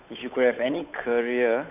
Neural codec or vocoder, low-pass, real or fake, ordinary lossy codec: codec, 16 kHz, 8 kbps, FunCodec, trained on Chinese and English, 25 frames a second; 3.6 kHz; fake; none